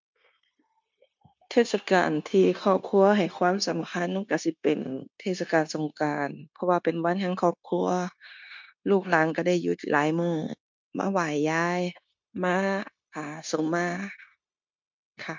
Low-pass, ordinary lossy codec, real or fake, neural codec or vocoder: 7.2 kHz; MP3, 64 kbps; fake; codec, 16 kHz, 0.9 kbps, LongCat-Audio-Codec